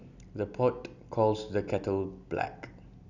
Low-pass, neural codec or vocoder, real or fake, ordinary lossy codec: 7.2 kHz; none; real; none